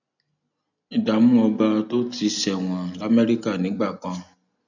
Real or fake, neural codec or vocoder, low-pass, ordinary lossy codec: real; none; 7.2 kHz; none